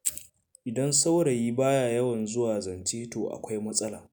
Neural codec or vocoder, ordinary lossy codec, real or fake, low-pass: none; none; real; none